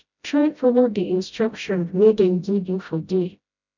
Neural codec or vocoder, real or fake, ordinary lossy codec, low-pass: codec, 16 kHz, 0.5 kbps, FreqCodec, smaller model; fake; none; 7.2 kHz